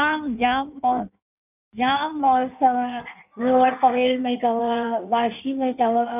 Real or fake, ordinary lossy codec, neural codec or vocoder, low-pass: fake; none; codec, 16 kHz in and 24 kHz out, 1.1 kbps, FireRedTTS-2 codec; 3.6 kHz